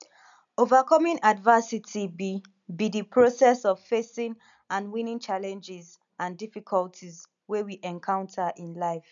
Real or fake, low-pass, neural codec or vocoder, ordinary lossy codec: real; 7.2 kHz; none; none